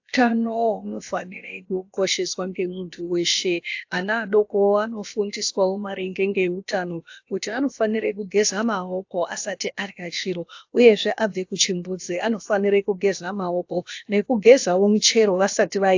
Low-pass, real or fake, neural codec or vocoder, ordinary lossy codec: 7.2 kHz; fake; codec, 16 kHz, about 1 kbps, DyCAST, with the encoder's durations; AAC, 48 kbps